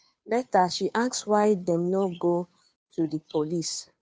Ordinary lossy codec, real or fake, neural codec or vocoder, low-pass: none; fake; codec, 16 kHz, 2 kbps, FunCodec, trained on Chinese and English, 25 frames a second; none